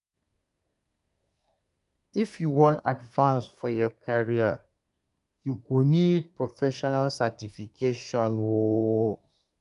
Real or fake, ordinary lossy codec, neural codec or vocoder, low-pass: fake; none; codec, 24 kHz, 1 kbps, SNAC; 10.8 kHz